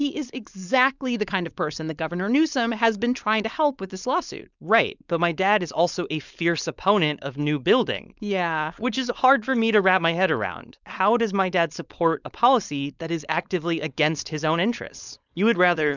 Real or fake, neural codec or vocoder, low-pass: fake; codec, 16 kHz, 4.8 kbps, FACodec; 7.2 kHz